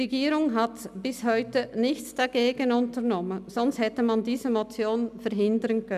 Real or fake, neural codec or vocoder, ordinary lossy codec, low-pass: real; none; none; 14.4 kHz